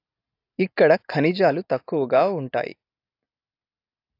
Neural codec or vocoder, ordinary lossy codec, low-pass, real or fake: none; AAC, 32 kbps; 5.4 kHz; real